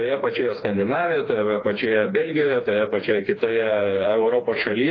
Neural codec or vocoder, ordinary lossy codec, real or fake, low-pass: codec, 16 kHz, 2 kbps, FreqCodec, smaller model; AAC, 32 kbps; fake; 7.2 kHz